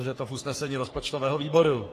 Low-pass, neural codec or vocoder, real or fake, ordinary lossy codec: 14.4 kHz; codec, 44.1 kHz, 3.4 kbps, Pupu-Codec; fake; AAC, 48 kbps